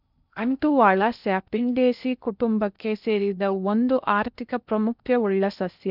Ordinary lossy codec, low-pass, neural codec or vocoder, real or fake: none; 5.4 kHz; codec, 16 kHz in and 24 kHz out, 0.6 kbps, FocalCodec, streaming, 2048 codes; fake